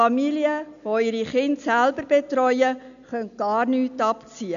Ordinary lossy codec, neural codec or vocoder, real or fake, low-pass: none; none; real; 7.2 kHz